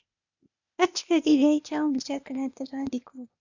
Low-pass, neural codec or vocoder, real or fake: 7.2 kHz; codec, 16 kHz, 0.8 kbps, ZipCodec; fake